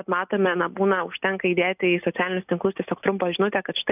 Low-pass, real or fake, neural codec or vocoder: 3.6 kHz; real; none